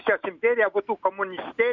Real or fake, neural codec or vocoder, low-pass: real; none; 7.2 kHz